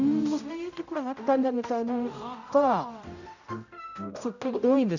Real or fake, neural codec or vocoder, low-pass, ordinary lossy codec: fake; codec, 16 kHz, 0.5 kbps, X-Codec, HuBERT features, trained on general audio; 7.2 kHz; none